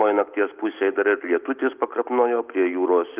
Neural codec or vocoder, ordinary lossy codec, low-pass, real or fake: none; Opus, 32 kbps; 3.6 kHz; real